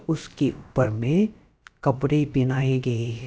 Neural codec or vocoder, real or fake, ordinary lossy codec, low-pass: codec, 16 kHz, about 1 kbps, DyCAST, with the encoder's durations; fake; none; none